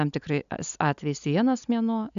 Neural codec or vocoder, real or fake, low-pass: none; real; 7.2 kHz